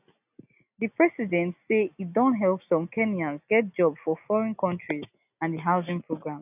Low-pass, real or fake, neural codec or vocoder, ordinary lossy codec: 3.6 kHz; real; none; none